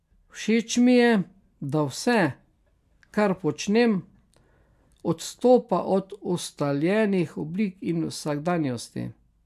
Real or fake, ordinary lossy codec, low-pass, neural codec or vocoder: real; MP3, 96 kbps; 14.4 kHz; none